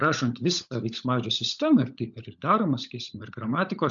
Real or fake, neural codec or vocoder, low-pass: fake; codec, 16 kHz, 16 kbps, FunCodec, trained on LibriTTS, 50 frames a second; 7.2 kHz